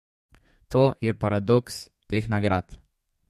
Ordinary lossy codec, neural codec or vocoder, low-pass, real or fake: MP3, 64 kbps; codec, 32 kHz, 1.9 kbps, SNAC; 14.4 kHz; fake